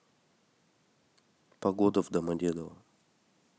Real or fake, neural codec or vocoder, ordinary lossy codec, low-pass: real; none; none; none